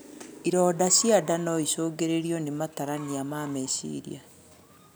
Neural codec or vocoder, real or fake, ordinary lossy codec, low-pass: none; real; none; none